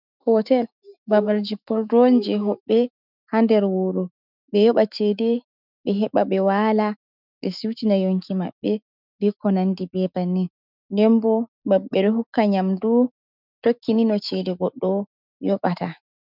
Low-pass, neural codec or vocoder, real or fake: 5.4 kHz; autoencoder, 48 kHz, 128 numbers a frame, DAC-VAE, trained on Japanese speech; fake